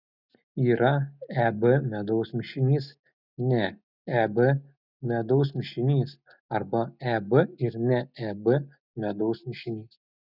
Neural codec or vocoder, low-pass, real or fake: none; 5.4 kHz; real